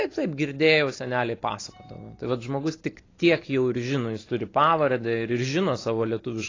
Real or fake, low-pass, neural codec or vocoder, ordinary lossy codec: real; 7.2 kHz; none; AAC, 32 kbps